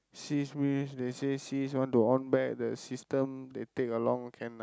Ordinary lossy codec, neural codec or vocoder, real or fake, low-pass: none; none; real; none